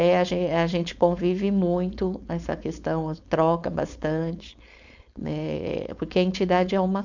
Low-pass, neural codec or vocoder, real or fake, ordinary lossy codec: 7.2 kHz; codec, 16 kHz, 4.8 kbps, FACodec; fake; none